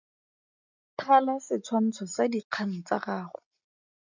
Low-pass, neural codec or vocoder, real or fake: 7.2 kHz; none; real